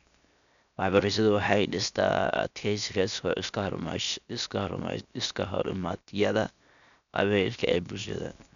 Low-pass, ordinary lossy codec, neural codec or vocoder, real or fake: 7.2 kHz; none; codec, 16 kHz, 0.7 kbps, FocalCodec; fake